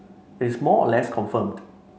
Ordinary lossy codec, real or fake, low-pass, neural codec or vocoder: none; real; none; none